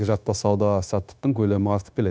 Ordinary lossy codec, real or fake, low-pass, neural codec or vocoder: none; fake; none; codec, 16 kHz, 0.9 kbps, LongCat-Audio-Codec